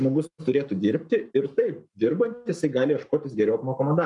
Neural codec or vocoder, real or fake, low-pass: codec, 44.1 kHz, 7.8 kbps, Pupu-Codec; fake; 10.8 kHz